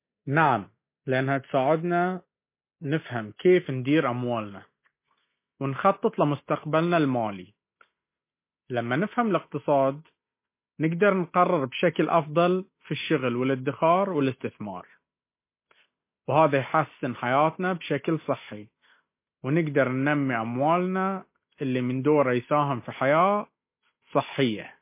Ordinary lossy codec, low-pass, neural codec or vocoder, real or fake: MP3, 24 kbps; 3.6 kHz; none; real